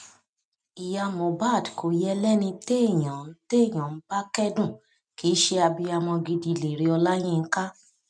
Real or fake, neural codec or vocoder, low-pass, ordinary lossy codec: real; none; 9.9 kHz; none